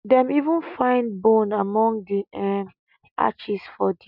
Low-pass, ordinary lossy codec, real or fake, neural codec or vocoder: 5.4 kHz; none; real; none